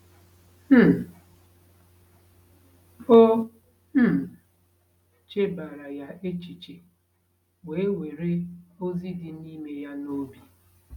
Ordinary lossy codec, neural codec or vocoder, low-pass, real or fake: none; none; 19.8 kHz; real